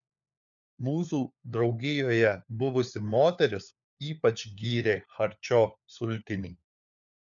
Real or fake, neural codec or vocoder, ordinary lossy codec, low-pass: fake; codec, 16 kHz, 4 kbps, FunCodec, trained on LibriTTS, 50 frames a second; MP3, 96 kbps; 7.2 kHz